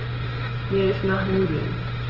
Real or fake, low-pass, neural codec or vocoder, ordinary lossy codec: real; 5.4 kHz; none; Opus, 16 kbps